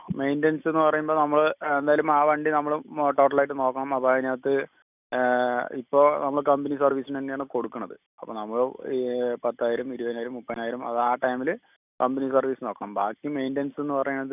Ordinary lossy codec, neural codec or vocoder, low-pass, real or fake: none; none; 3.6 kHz; real